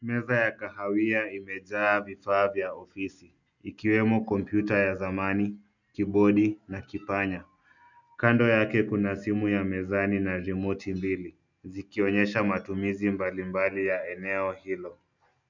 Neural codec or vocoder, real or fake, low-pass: none; real; 7.2 kHz